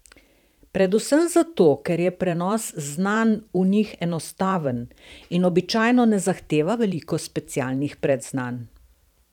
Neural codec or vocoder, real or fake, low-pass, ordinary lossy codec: vocoder, 44.1 kHz, 128 mel bands every 512 samples, BigVGAN v2; fake; 19.8 kHz; none